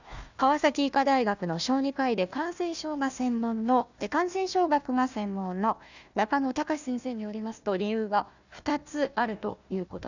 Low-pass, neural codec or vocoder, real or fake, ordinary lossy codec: 7.2 kHz; codec, 16 kHz, 1 kbps, FunCodec, trained on Chinese and English, 50 frames a second; fake; none